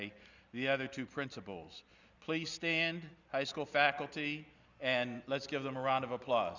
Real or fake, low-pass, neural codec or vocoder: real; 7.2 kHz; none